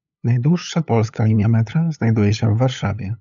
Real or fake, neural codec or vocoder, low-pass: fake; codec, 16 kHz, 8 kbps, FunCodec, trained on LibriTTS, 25 frames a second; 7.2 kHz